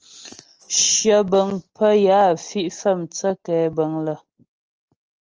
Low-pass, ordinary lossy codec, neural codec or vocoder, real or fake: 7.2 kHz; Opus, 24 kbps; none; real